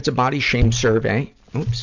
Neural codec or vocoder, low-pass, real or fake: none; 7.2 kHz; real